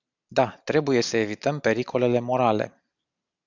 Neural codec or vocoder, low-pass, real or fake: none; 7.2 kHz; real